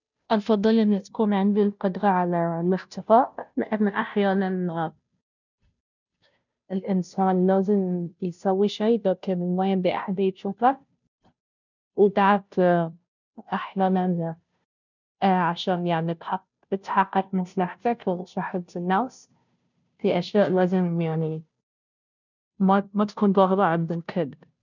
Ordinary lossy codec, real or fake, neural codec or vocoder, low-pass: none; fake; codec, 16 kHz, 0.5 kbps, FunCodec, trained on Chinese and English, 25 frames a second; 7.2 kHz